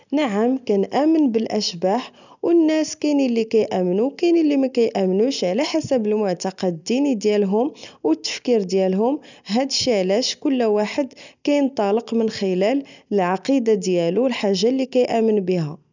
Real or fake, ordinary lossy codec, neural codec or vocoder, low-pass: real; none; none; 7.2 kHz